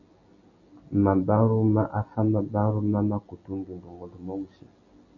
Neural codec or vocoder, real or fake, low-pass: none; real; 7.2 kHz